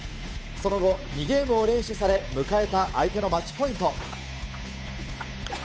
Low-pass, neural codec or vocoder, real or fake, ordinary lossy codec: none; codec, 16 kHz, 8 kbps, FunCodec, trained on Chinese and English, 25 frames a second; fake; none